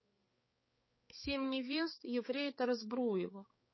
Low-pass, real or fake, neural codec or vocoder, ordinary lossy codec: 7.2 kHz; fake; codec, 16 kHz, 4 kbps, X-Codec, HuBERT features, trained on balanced general audio; MP3, 24 kbps